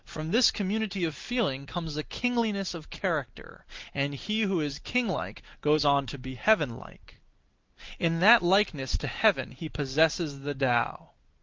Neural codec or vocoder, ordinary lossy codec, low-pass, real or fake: none; Opus, 32 kbps; 7.2 kHz; real